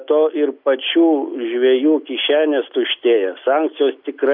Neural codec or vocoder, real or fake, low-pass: none; real; 5.4 kHz